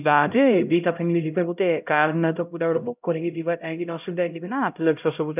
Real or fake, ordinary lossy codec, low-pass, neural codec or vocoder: fake; none; 3.6 kHz; codec, 16 kHz, 0.5 kbps, X-Codec, HuBERT features, trained on LibriSpeech